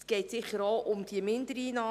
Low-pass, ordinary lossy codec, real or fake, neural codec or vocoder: 14.4 kHz; none; real; none